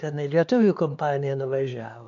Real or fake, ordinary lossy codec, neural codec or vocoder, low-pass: fake; AAC, 64 kbps; codec, 16 kHz, 2 kbps, X-Codec, HuBERT features, trained on LibriSpeech; 7.2 kHz